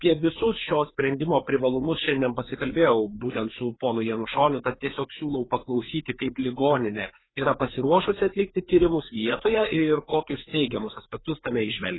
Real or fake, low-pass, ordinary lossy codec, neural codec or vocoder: fake; 7.2 kHz; AAC, 16 kbps; codec, 16 kHz, 4 kbps, FreqCodec, larger model